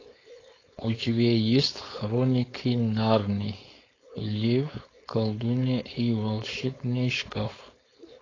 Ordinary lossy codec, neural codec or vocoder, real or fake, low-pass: AAC, 32 kbps; codec, 16 kHz, 4.8 kbps, FACodec; fake; 7.2 kHz